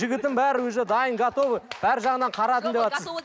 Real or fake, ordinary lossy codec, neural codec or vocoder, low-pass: real; none; none; none